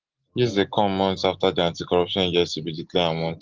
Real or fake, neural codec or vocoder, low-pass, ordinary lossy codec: real; none; 7.2 kHz; Opus, 16 kbps